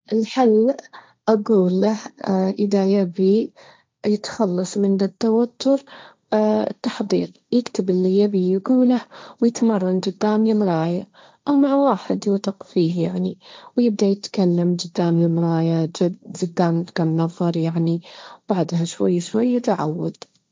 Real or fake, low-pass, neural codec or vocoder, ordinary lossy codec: fake; none; codec, 16 kHz, 1.1 kbps, Voila-Tokenizer; none